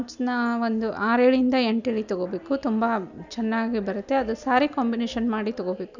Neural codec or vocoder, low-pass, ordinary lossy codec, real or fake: none; 7.2 kHz; none; real